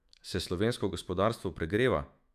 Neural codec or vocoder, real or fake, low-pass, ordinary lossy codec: autoencoder, 48 kHz, 128 numbers a frame, DAC-VAE, trained on Japanese speech; fake; 14.4 kHz; none